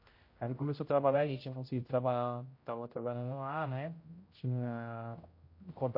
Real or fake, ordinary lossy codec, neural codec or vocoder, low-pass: fake; MP3, 32 kbps; codec, 16 kHz, 0.5 kbps, X-Codec, HuBERT features, trained on general audio; 5.4 kHz